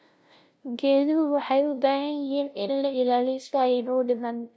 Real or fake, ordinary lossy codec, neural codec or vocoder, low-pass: fake; none; codec, 16 kHz, 0.5 kbps, FunCodec, trained on LibriTTS, 25 frames a second; none